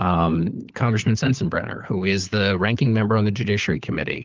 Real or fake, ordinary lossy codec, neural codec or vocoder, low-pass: fake; Opus, 24 kbps; codec, 16 kHz, 4 kbps, FunCodec, trained on LibriTTS, 50 frames a second; 7.2 kHz